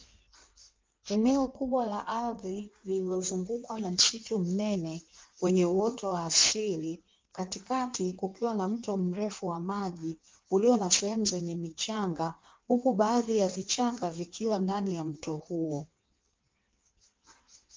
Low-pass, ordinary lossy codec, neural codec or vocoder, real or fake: 7.2 kHz; Opus, 32 kbps; codec, 16 kHz in and 24 kHz out, 1.1 kbps, FireRedTTS-2 codec; fake